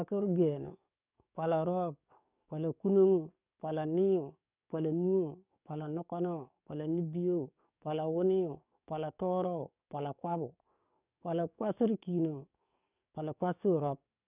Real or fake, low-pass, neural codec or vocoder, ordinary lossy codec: fake; 3.6 kHz; codec, 44.1 kHz, 7.8 kbps, DAC; none